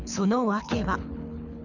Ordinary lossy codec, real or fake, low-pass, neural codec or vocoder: none; fake; 7.2 kHz; codec, 24 kHz, 6 kbps, HILCodec